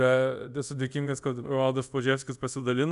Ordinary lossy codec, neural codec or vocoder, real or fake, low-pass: MP3, 96 kbps; codec, 24 kHz, 0.5 kbps, DualCodec; fake; 10.8 kHz